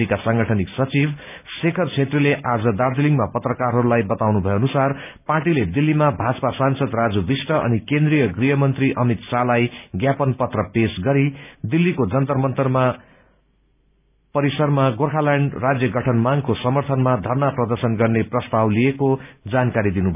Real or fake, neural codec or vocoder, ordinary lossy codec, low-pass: real; none; none; 3.6 kHz